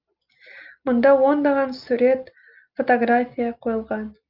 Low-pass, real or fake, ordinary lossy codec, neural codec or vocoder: 5.4 kHz; real; Opus, 24 kbps; none